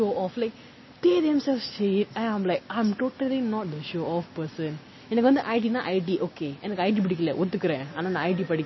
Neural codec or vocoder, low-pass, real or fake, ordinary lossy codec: none; 7.2 kHz; real; MP3, 24 kbps